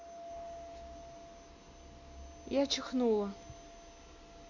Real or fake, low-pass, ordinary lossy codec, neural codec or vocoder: real; 7.2 kHz; none; none